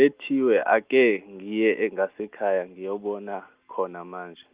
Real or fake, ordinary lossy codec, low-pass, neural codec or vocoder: real; Opus, 32 kbps; 3.6 kHz; none